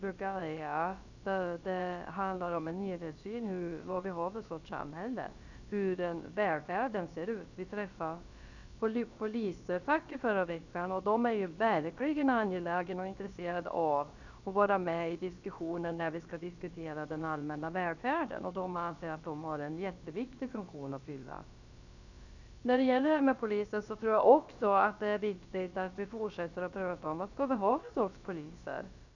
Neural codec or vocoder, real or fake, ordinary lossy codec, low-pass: codec, 16 kHz, about 1 kbps, DyCAST, with the encoder's durations; fake; none; 7.2 kHz